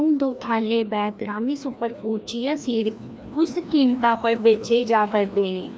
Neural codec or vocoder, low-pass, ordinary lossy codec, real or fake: codec, 16 kHz, 1 kbps, FreqCodec, larger model; none; none; fake